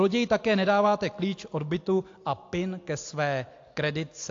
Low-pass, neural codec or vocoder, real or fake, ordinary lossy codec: 7.2 kHz; none; real; AAC, 48 kbps